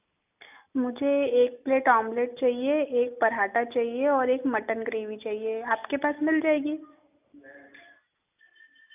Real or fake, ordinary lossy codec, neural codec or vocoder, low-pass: real; none; none; 3.6 kHz